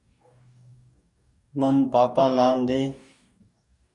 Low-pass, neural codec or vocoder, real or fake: 10.8 kHz; codec, 44.1 kHz, 2.6 kbps, DAC; fake